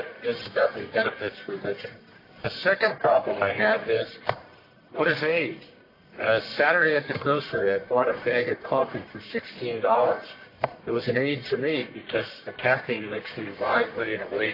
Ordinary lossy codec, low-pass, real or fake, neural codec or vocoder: AAC, 32 kbps; 5.4 kHz; fake; codec, 44.1 kHz, 1.7 kbps, Pupu-Codec